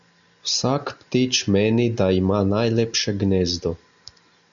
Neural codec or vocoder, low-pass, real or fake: none; 7.2 kHz; real